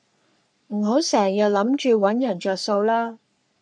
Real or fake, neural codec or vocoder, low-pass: fake; codec, 44.1 kHz, 3.4 kbps, Pupu-Codec; 9.9 kHz